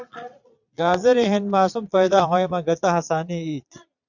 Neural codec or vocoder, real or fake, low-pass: vocoder, 22.05 kHz, 80 mel bands, Vocos; fake; 7.2 kHz